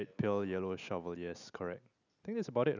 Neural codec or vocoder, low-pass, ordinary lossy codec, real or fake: none; 7.2 kHz; none; real